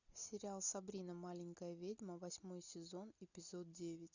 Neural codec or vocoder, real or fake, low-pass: none; real; 7.2 kHz